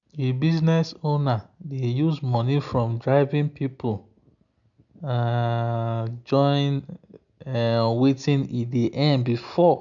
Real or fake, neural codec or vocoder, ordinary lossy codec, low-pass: real; none; none; 7.2 kHz